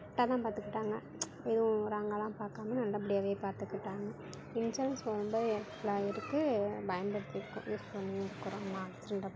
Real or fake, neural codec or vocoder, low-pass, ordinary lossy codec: real; none; none; none